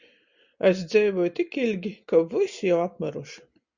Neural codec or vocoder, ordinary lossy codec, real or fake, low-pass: none; Opus, 64 kbps; real; 7.2 kHz